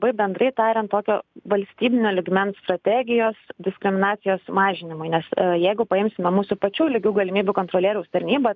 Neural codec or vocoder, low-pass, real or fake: none; 7.2 kHz; real